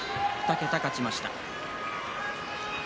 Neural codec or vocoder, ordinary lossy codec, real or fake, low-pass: none; none; real; none